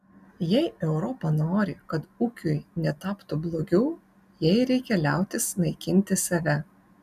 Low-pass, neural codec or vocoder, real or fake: 14.4 kHz; none; real